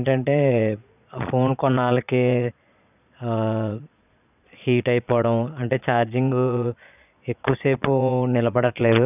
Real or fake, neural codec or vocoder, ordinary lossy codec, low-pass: fake; vocoder, 22.05 kHz, 80 mel bands, Vocos; none; 3.6 kHz